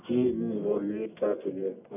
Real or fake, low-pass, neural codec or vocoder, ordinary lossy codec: fake; 3.6 kHz; codec, 44.1 kHz, 1.7 kbps, Pupu-Codec; none